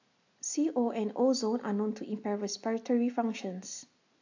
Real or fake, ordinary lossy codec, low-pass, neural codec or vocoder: real; AAC, 48 kbps; 7.2 kHz; none